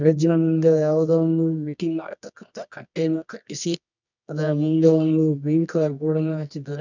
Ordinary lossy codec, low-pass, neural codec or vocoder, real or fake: none; 7.2 kHz; codec, 24 kHz, 0.9 kbps, WavTokenizer, medium music audio release; fake